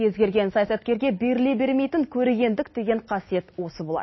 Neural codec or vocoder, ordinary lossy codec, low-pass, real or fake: none; MP3, 24 kbps; 7.2 kHz; real